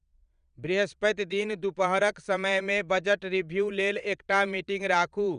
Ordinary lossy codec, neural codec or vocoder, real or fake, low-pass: none; vocoder, 22.05 kHz, 80 mel bands, Vocos; fake; 9.9 kHz